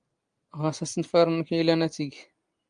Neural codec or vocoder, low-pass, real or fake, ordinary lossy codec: none; 9.9 kHz; real; Opus, 32 kbps